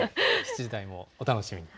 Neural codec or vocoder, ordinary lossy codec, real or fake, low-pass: none; none; real; none